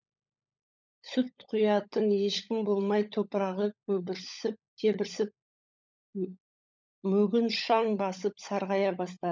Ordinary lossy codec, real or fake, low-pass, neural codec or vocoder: none; fake; none; codec, 16 kHz, 16 kbps, FunCodec, trained on LibriTTS, 50 frames a second